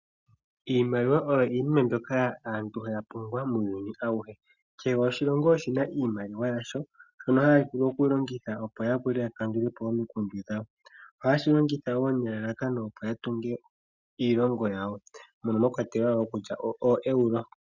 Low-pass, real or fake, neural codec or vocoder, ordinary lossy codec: 7.2 kHz; real; none; Opus, 64 kbps